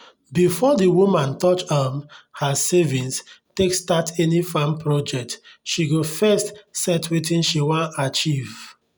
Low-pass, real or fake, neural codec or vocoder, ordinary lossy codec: none; real; none; none